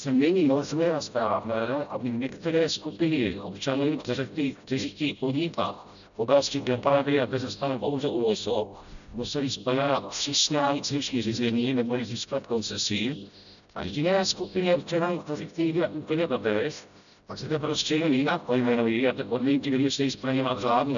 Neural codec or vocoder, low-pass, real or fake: codec, 16 kHz, 0.5 kbps, FreqCodec, smaller model; 7.2 kHz; fake